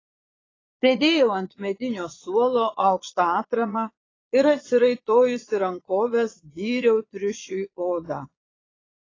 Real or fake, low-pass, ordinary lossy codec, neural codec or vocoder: fake; 7.2 kHz; AAC, 32 kbps; vocoder, 22.05 kHz, 80 mel bands, Vocos